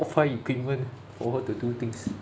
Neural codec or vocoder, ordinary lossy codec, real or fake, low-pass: none; none; real; none